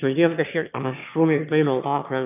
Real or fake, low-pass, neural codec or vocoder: fake; 3.6 kHz; autoencoder, 22.05 kHz, a latent of 192 numbers a frame, VITS, trained on one speaker